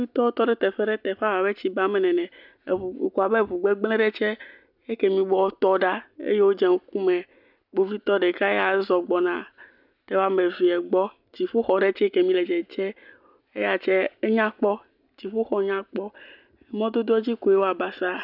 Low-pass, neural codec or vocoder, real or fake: 5.4 kHz; none; real